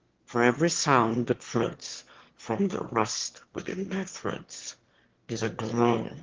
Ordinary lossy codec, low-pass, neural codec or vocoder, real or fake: Opus, 16 kbps; 7.2 kHz; autoencoder, 22.05 kHz, a latent of 192 numbers a frame, VITS, trained on one speaker; fake